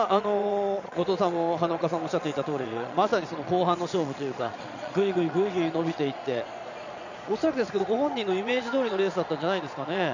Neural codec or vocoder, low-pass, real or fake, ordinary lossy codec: vocoder, 22.05 kHz, 80 mel bands, Vocos; 7.2 kHz; fake; none